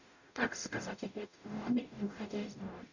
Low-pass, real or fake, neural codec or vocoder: 7.2 kHz; fake; codec, 44.1 kHz, 0.9 kbps, DAC